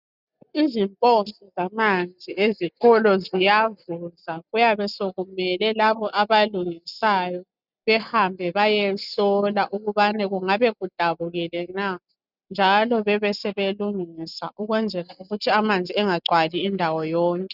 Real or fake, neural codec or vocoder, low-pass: real; none; 5.4 kHz